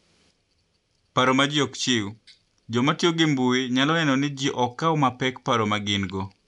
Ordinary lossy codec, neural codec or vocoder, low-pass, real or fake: none; none; 10.8 kHz; real